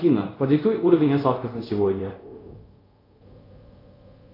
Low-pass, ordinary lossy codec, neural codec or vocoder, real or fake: 5.4 kHz; AAC, 24 kbps; codec, 24 kHz, 0.5 kbps, DualCodec; fake